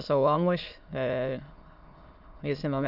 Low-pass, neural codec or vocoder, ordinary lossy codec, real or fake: 5.4 kHz; autoencoder, 22.05 kHz, a latent of 192 numbers a frame, VITS, trained on many speakers; none; fake